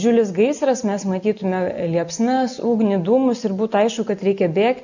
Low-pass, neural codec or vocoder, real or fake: 7.2 kHz; none; real